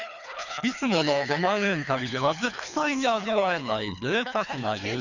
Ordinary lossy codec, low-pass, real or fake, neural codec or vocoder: none; 7.2 kHz; fake; codec, 24 kHz, 3 kbps, HILCodec